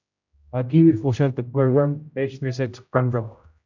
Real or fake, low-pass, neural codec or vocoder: fake; 7.2 kHz; codec, 16 kHz, 0.5 kbps, X-Codec, HuBERT features, trained on general audio